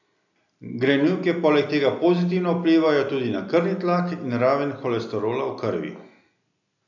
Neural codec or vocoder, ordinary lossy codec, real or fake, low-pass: none; none; real; 7.2 kHz